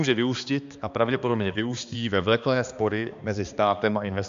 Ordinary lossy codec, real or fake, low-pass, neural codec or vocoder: MP3, 64 kbps; fake; 7.2 kHz; codec, 16 kHz, 2 kbps, X-Codec, HuBERT features, trained on balanced general audio